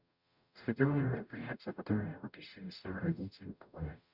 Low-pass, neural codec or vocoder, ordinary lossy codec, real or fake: 5.4 kHz; codec, 44.1 kHz, 0.9 kbps, DAC; none; fake